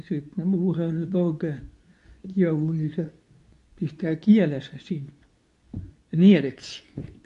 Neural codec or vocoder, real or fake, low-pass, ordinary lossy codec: codec, 24 kHz, 0.9 kbps, WavTokenizer, medium speech release version 2; fake; 10.8 kHz; MP3, 64 kbps